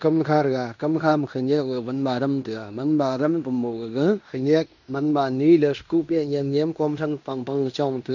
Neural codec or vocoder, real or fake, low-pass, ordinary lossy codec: codec, 16 kHz in and 24 kHz out, 0.9 kbps, LongCat-Audio-Codec, fine tuned four codebook decoder; fake; 7.2 kHz; none